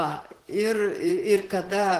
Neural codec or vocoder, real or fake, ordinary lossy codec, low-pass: vocoder, 44.1 kHz, 128 mel bands, Pupu-Vocoder; fake; Opus, 16 kbps; 14.4 kHz